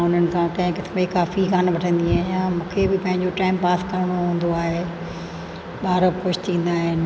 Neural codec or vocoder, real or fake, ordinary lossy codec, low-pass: none; real; none; none